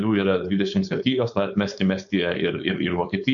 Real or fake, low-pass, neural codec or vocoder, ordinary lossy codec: fake; 7.2 kHz; codec, 16 kHz, 4.8 kbps, FACodec; MP3, 64 kbps